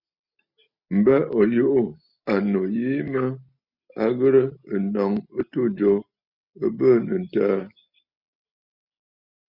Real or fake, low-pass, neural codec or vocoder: real; 5.4 kHz; none